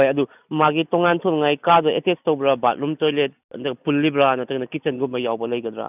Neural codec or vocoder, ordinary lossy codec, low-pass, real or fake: none; none; 3.6 kHz; real